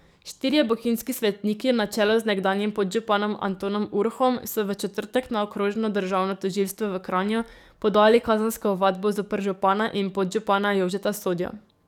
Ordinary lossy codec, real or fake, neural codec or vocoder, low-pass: none; fake; codec, 44.1 kHz, 7.8 kbps, DAC; 19.8 kHz